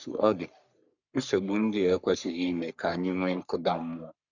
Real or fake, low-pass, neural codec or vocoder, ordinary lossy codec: fake; 7.2 kHz; codec, 44.1 kHz, 3.4 kbps, Pupu-Codec; none